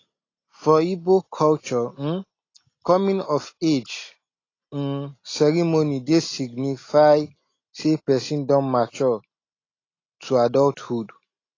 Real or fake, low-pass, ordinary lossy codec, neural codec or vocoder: real; 7.2 kHz; AAC, 32 kbps; none